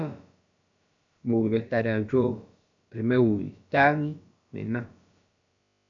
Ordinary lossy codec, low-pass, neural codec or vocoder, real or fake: MP3, 64 kbps; 7.2 kHz; codec, 16 kHz, about 1 kbps, DyCAST, with the encoder's durations; fake